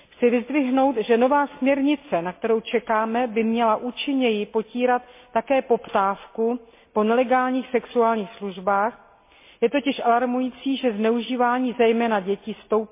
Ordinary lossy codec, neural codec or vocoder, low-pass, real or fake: MP3, 24 kbps; none; 3.6 kHz; real